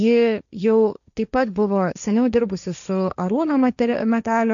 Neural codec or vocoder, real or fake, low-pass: codec, 16 kHz, 1.1 kbps, Voila-Tokenizer; fake; 7.2 kHz